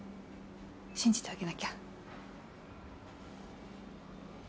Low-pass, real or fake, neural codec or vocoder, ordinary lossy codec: none; real; none; none